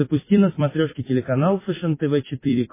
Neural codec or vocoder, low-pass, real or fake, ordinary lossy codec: vocoder, 44.1 kHz, 128 mel bands every 256 samples, BigVGAN v2; 3.6 kHz; fake; MP3, 16 kbps